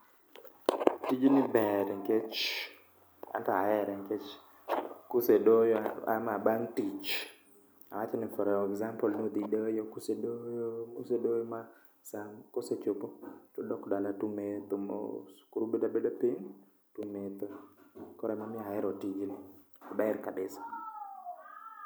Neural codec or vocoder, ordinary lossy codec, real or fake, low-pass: none; none; real; none